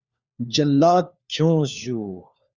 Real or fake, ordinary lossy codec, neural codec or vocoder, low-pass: fake; Opus, 64 kbps; codec, 16 kHz, 4 kbps, FunCodec, trained on LibriTTS, 50 frames a second; 7.2 kHz